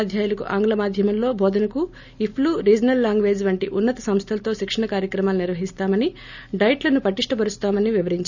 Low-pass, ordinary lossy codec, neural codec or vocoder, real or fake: 7.2 kHz; none; none; real